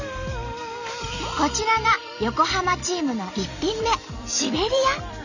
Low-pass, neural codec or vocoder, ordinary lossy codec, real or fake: 7.2 kHz; vocoder, 44.1 kHz, 128 mel bands every 256 samples, BigVGAN v2; none; fake